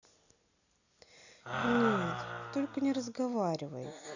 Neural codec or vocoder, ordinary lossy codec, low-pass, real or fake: none; none; 7.2 kHz; real